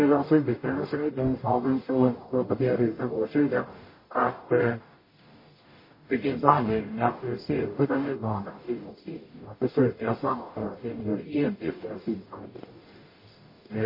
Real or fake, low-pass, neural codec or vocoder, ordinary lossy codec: fake; 5.4 kHz; codec, 44.1 kHz, 0.9 kbps, DAC; MP3, 24 kbps